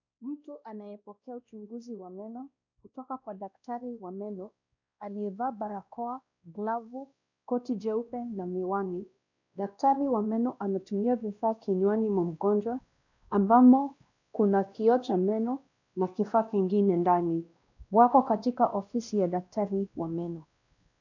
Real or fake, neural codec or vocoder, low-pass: fake; codec, 16 kHz, 1 kbps, X-Codec, WavLM features, trained on Multilingual LibriSpeech; 7.2 kHz